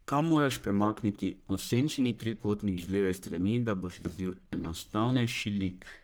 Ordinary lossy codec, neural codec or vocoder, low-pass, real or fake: none; codec, 44.1 kHz, 1.7 kbps, Pupu-Codec; none; fake